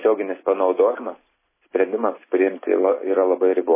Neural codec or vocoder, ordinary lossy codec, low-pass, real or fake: none; MP3, 16 kbps; 3.6 kHz; real